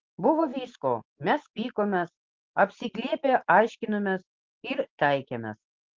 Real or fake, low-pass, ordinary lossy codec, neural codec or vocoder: real; 7.2 kHz; Opus, 16 kbps; none